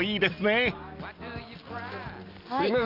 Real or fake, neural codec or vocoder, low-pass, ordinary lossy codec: real; none; 5.4 kHz; Opus, 32 kbps